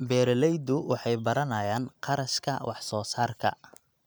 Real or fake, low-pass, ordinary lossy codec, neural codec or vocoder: real; none; none; none